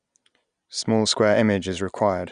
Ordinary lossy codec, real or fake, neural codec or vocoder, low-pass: none; real; none; 9.9 kHz